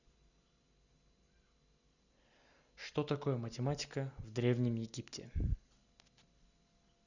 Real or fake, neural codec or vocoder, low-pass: real; none; 7.2 kHz